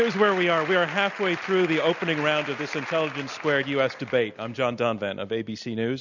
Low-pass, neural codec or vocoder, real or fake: 7.2 kHz; none; real